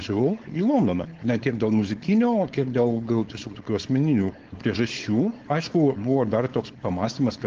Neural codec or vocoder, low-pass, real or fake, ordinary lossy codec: codec, 16 kHz, 4.8 kbps, FACodec; 7.2 kHz; fake; Opus, 16 kbps